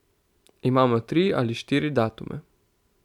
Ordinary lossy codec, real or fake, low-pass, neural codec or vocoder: none; real; 19.8 kHz; none